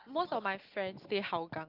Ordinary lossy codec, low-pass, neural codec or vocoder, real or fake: Opus, 24 kbps; 5.4 kHz; none; real